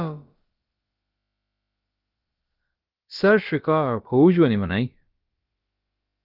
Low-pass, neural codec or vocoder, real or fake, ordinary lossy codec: 5.4 kHz; codec, 16 kHz, about 1 kbps, DyCAST, with the encoder's durations; fake; Opus, 24 kbps